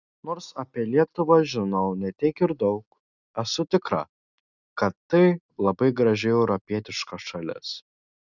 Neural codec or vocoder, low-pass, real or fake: none; 7.2 kHz; real